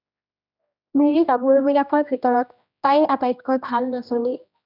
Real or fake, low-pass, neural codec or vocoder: fake; 5.4 kHz; codec, 16 kHz, 1 kbps, X-Codec, HuBERT features, trained on general audio